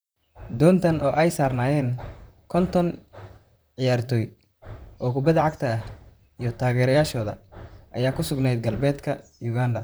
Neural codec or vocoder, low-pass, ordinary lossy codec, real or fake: vocoder, 44.1 kHz, 128 mel bands, Pupu-Vocoder; none; none; fake